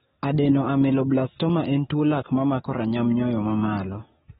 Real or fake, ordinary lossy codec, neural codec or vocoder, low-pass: fake; AAC, 16 kbps; codec, 44.1 kHz, 7.8 kbps, DAC; 19.8 kHz